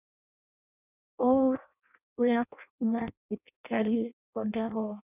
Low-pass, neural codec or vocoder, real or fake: 3.6 kHz; codec, 16 kHz in and 24 kHz out, 0.6 kbps, FireRedTTS-2 codec; fake